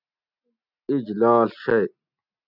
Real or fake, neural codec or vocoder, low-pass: real; none; 5.4 kHz